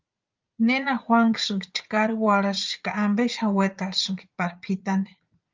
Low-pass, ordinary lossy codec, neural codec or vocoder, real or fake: 7.2 kHz; Opus, 24 kbps; vocoder, 44.1 kHz, 80 mel bands, Vocos; fake